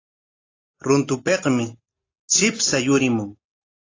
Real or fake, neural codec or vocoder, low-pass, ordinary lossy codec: real; none; 7.2 kHz; AAC, 32 kbps